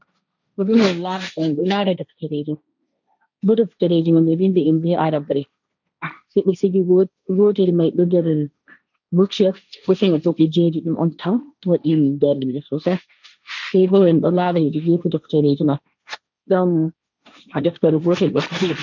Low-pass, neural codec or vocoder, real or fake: 7.2 kHz; codec, 16 kHz, 1.1 kbps, Voila-Tokenizer; fake